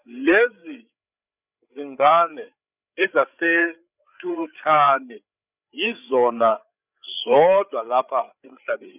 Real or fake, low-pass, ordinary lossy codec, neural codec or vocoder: fake; 3.6 kHz; none; codec, 16 kHz, 8 kbps, FreqCodec, larger model